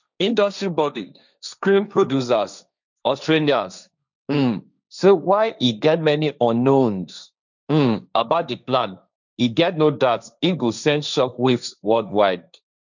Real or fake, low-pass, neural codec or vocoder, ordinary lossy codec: fake; 7.2 kHz; codec, 16 kHz, 1.1 kbps, Voila-Tokenizer; none